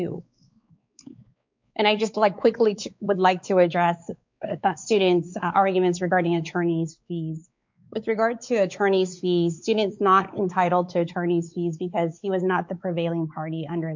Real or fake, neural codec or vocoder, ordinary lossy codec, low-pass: fake; codec, 16 kHz, 4 kbps, X-Codec, WavLM features, trained on Multilingual LibriSpeech; MP3, 64 kbps; 7.2 kHz